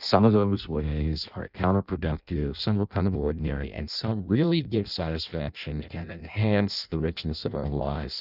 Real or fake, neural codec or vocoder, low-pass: fake; codec, 16 kHz in and 24 kHz out, 0.6 kbps, FireRedTTS-2 codec; 5.4 kHz